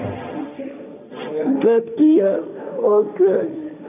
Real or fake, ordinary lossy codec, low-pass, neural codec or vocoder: fake; none; 3.6 kHz; codec, 16 kHz, 1.1 kbps, Voila-Tokenizer